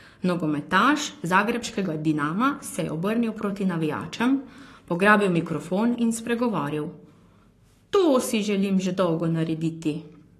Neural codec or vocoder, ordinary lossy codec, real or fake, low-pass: codec, 44.1 kHz, 7.8 kbps, Pupu-Codec; AAC, 48 kbps; fake; 14.4 kHz